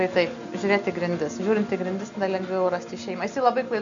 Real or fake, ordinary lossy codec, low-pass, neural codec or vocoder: real; AAC, 64 kbps; 7.2 kHz; none